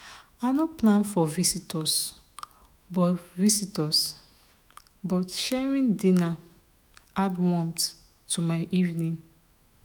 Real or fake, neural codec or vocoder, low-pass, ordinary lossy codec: fake; autoencoder, 48 kHz, 128 numbers a frame, DAC-VAE, trained on Japanese speech; none; none